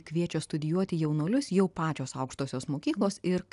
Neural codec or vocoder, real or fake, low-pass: none; real; 10.8 kHz